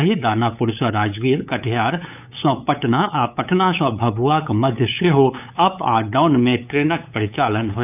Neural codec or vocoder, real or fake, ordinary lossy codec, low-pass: codec, 16 kHz, 8 kbps, FunCodec, trained on LibriTTS, 25 frames a second; fake; none; 3.6 kHz